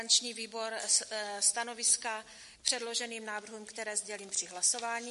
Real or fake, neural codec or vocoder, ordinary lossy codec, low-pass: real; none; MP3, 48 kbps; 14.4 kHz